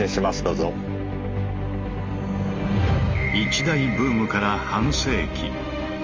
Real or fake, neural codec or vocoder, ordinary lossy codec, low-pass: real; none; Opus, 32 kbps; 7.2 kHz